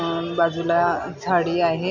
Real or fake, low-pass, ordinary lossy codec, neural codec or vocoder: real; 7.2 kHz; none; none